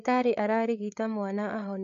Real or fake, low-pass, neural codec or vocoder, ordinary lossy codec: fake; 7.2 kHz; codec, 16 kHz, 8 kbps, FreqCodec, larger model; none